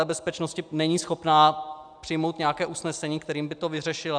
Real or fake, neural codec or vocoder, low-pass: real; none; 9.9 kHz